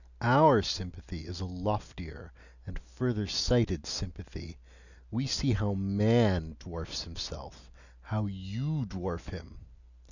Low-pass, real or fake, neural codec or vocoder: 7.2 kHz; real; none